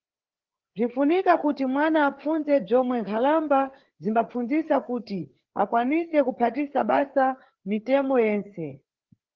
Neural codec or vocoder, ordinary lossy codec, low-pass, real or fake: codec, 16 kHz, 4 kbps, FreqCodec, larger model; Opus, 16 kbps; 7.2 kHz; fake